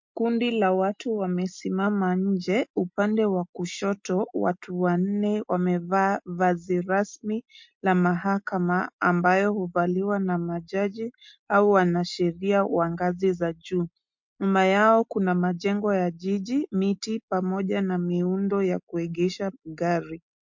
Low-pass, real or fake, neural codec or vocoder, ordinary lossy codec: 7.2 kHz; real; none; MP3, 48 kbps